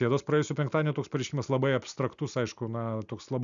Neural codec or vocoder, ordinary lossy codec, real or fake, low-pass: none; MP3, 96 kbps; real; 7.2 kHz